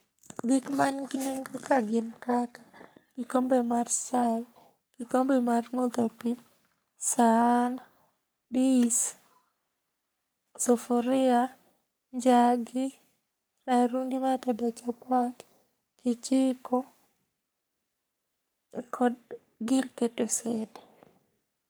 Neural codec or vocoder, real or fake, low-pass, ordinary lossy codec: codec, 44.1 kHz, 3.4 kbps, Pupu-Codec; fake; none; none